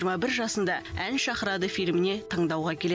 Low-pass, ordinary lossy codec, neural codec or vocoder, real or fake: none; none; none; real